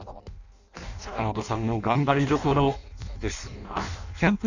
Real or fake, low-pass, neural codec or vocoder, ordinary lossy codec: fake; 7.2 kHz; codec, 16 kHz in and 24 kHz out, 0.6 kbps, FireRedTTS-2 codec; none